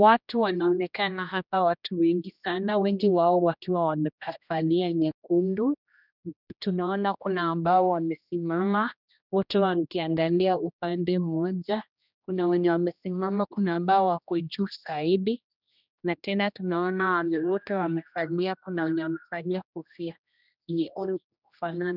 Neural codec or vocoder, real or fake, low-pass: codec, 16 kHz, 1 kbps, X-Codec, HuBERT features, trained on general audio; fake; 5.4 kHz